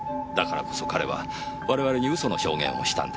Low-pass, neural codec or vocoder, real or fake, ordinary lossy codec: none; none; real; none